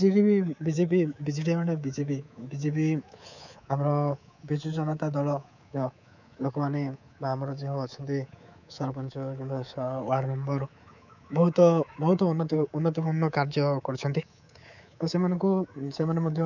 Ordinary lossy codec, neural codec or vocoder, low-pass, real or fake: none; codec, 24 kHz, 3.1 kbps, DualCodec; 7.2 kHz; fake